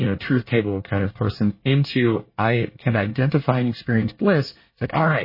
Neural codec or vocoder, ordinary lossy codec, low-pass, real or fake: codec, 24 kHz, 1 kbps, SNAC; MP3, 24 kbps; 5.4 kHz; fake